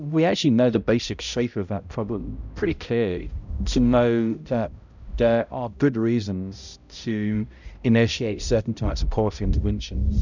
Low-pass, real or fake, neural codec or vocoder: 7.2 kHz; fake; codec, 16 kHz, 0.5 kbps, X-Codec, HuBERT features, trained on balanced general audio